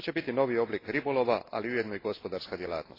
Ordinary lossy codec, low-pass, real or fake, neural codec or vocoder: AAC, 24 kbps; 5.4 kHz; real; none